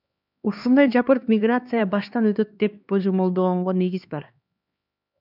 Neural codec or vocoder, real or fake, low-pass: codec, 16 kHz, 2 kbps, X-Codec, HuBERT features, trained on LibriSpeech; fake; 5.4 kHz